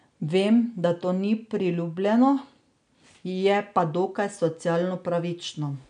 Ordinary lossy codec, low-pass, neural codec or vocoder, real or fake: none; 9.9 kHz; none; real